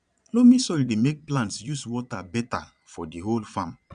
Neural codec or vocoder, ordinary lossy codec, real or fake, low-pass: vocoder, 22.05 kHz, 80 mel bands, Vocos; none; fake; 9.9 kHz